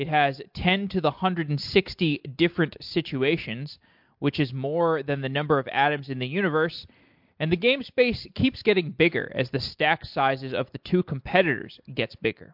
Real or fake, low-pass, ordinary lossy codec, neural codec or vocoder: real; 5.4 kHz; MP3, 48 kbps; none